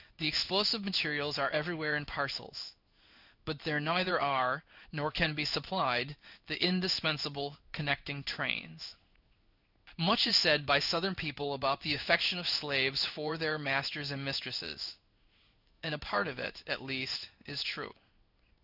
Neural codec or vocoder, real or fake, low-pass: codec, 16 kHz in and 24 kHz out, 1 kbps, XY-Tokenizer; fake; 5.4 kHz